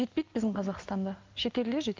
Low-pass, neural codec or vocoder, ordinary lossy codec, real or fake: 7.2 kHz; none; Opus, 24 kbps; real